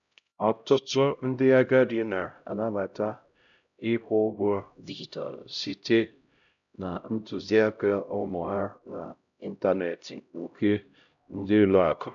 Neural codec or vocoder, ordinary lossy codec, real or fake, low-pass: codec, 16 kHz, 0.5 kbps, X-Codec, HuBERT features, trained on LibriSpeech; none; fake; 7.2 kHz